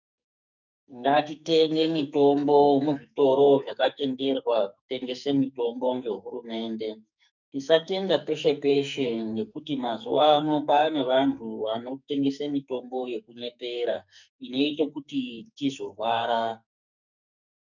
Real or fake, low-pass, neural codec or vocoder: fake; 7.2 kHz; codec, 44.1 kHz, 2.6 kbps, SNAC